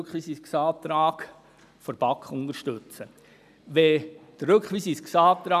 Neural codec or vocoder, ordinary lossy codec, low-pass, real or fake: none; none; 14.4 kHz; real